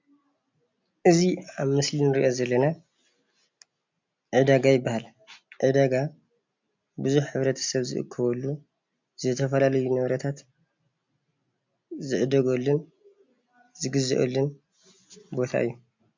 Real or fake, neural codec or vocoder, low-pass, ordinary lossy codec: real; none; 7.2 kHz; MP3, 64 kbps